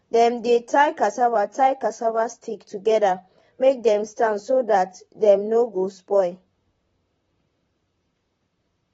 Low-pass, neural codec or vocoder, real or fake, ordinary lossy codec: 19.8 kHz; vocoder, 44.1 kHz, 128 mel bands, Pupu-Vocoder; fake; AAC, 24 kbps